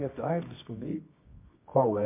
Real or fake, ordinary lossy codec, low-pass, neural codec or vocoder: fake; MP3, 24 kbps; 3.6 kHz; codec, 24 kHz, 0.9 kbps, WavTokenizer, medium music audio release